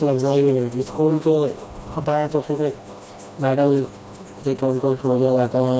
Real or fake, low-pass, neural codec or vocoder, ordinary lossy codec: fake; none; codec, 16 kHz, 1 kbps, FreqCodec, smaller model; none